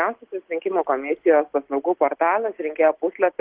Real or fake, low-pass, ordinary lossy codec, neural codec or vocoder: real; 3.6 kHz; Opus, 16 kbps; none